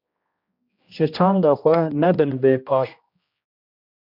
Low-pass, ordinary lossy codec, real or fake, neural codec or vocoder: 5.4 kHz; MP3, 48 kbps; fake; codec, 16 kHz, 1 kbps, X-Codec, HuBERT features, trained on general audio